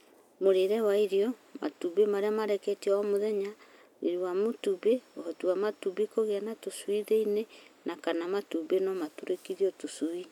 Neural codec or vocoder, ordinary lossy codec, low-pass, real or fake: none; none; 19.8 kHz; real